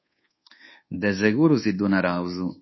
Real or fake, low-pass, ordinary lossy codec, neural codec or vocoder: fake; 7.2 kHz; MP3, 24 kbps; codec, 24 kHz, 1.2 kbps, DualCodec